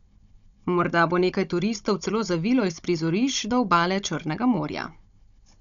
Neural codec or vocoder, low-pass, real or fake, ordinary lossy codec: codec, 16 kHz, 16 kbps, FunCodec, trained on Chinese and English, 50 frames a second; 7.2 kHz; fake; none